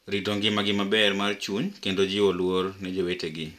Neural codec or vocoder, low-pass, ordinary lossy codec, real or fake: none; 14.4 kHz; none; real